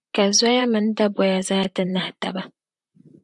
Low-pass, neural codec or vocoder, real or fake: 10.8 kHz; vocoder, 44.1 kHz, 128 mel bands, Pupu-Vocoder; fake